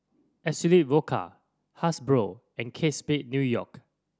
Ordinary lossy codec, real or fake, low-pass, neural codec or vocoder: none; real; none; none